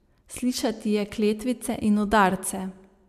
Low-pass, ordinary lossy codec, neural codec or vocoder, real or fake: 14.4 kHz; AAC, 96 kbps; none; real